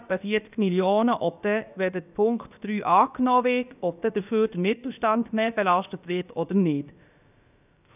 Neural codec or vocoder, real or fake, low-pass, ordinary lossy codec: codec, 16 kHz, about 1 kbps, DyCAST, with the encoder's durations; fake; 3.6 kHz; none